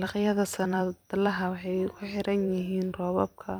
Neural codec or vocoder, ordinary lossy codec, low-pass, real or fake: vocoder, 44.1 kHz, 128 mel bands every 256 samples, BigVGAN v2; none; none; fake